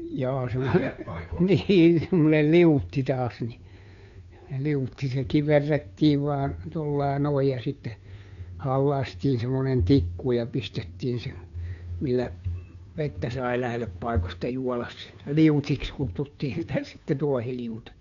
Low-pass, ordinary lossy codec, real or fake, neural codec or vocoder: 7.2 kHz; none; fake; codec, 16 kHz, 2 kbps, FunCodec, trained on Chinese and English, 25 frames a second